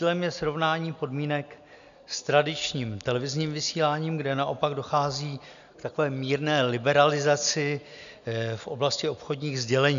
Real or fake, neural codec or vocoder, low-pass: real; none; 7.2 kHz